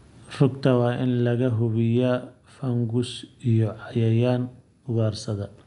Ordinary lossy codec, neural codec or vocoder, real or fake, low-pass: none; none; real; 10.8 kHz